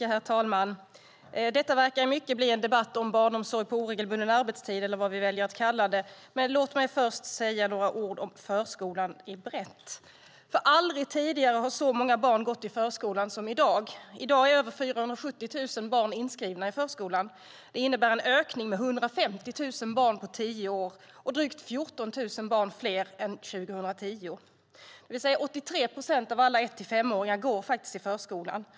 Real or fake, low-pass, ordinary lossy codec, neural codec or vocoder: real; none; none; none